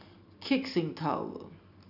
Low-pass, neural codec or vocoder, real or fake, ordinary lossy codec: 5.4 kHz; none; real; none